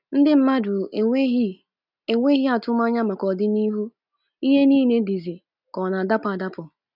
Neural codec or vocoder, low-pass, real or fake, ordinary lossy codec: none; 5.4 kHz; real; none